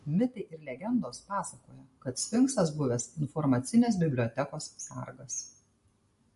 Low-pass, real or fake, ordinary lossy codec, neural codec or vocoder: 14.4 kHz; real; MP3, 48 kbps; none